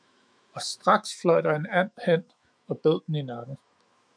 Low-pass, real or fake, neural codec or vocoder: 9.9 kHz; fake; autoencoder, 48 kHz, 128 numbers a frame, DAC-VAE, trained on Japanese speech